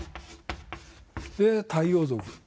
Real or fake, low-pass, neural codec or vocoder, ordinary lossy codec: real; none; none; none